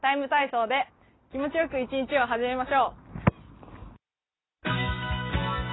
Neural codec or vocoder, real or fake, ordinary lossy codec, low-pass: none; real; AAC, 16 kbps; 7.2 kHz